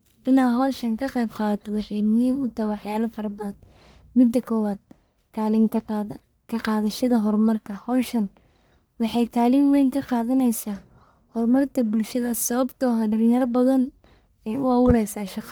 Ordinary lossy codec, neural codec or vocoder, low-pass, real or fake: none; codec, 44.1 kHz, 1.7 kbps, Pupu-Codec; none; fake